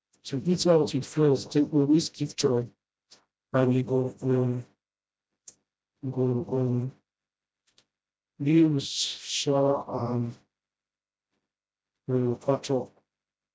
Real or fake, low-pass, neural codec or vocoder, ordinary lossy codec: fake; none; codec, 16 kHz, 0.5 kbps, FreqCodec, smaller model; none